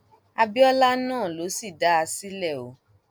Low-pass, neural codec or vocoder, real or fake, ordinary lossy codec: none; none; real; none